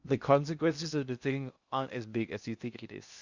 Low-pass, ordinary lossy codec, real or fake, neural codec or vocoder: 7.2 kHz; Opus, 64 kbps; fake; codec, 16 kHz in and 24 kHz out, 0.6 kbps, FocalCodec, streaming, 2048 codes